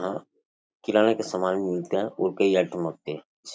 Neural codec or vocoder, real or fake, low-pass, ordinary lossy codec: none; real; none; none